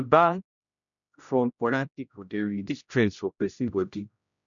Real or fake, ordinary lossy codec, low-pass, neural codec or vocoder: fake; none; 7.2 kHz; codec, 16 kHz, 0.5 kbps, X-Codec, HuBERT features, trained on general audio